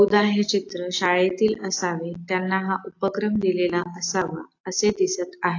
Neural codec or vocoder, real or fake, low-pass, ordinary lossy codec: none; real; 7.2 kHz; AAC, 48 kbps